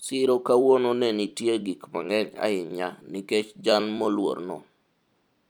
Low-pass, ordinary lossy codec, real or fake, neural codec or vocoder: 19.8 kHz; none; fake; vocoder, 44.1 kHz, 128 mel bands every 256 samples, BigVGAN v2